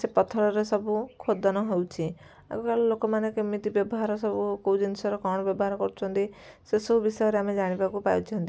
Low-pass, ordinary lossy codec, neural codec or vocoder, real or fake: none; none; none; real